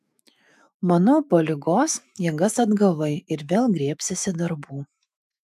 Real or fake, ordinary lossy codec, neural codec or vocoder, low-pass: fake; AAC, 96 kbps; autoencoder, 48 kHz, 128 numbers a frame, DAC-VAE, trained on Japanese speech; 14.4 kHz